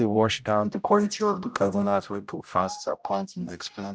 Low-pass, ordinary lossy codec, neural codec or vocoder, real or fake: none; none; codec, 16 kHz, 0.5 kbps, X-Codec, HuBERT features, trained on general audio; fake